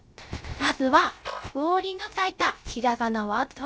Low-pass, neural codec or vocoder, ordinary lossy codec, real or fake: none; codec, 16 kHz, 0.3 kbps, FocalCodec; none; fake